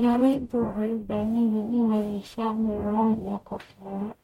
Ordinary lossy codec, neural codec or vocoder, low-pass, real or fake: MP3, 64 kbps; codec, 44.1 kHz, 0.9 kbps, DAC; 19.8 kHz; fake